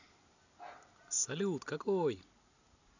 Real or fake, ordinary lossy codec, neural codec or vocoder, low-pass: real; none; none; 7.2 kHz